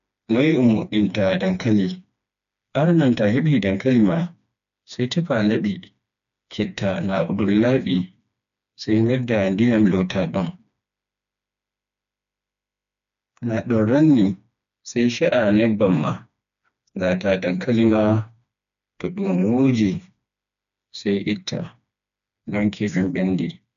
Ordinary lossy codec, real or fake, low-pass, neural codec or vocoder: none; fake; 7.2 kHz; codec, 16 kHz, 2 kbps, FreqCodec, smaller model